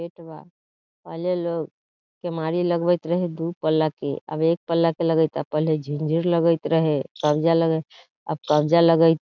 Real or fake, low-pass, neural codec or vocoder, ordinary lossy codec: real; 7.2 kHz; none; none